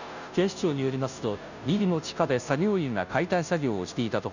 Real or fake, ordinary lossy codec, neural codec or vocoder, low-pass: fake; none; codec, 16 kHz, 0.5 kbps, FunCodec, trained on Chinese and English, 25 frames a second; 7.2 kHz